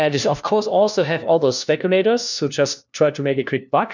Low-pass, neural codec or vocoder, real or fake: 7.2 kHz; codec, 16 kHz, 1 kbps, FunCodec, trained on LibriTTS, 50 frames a second; fake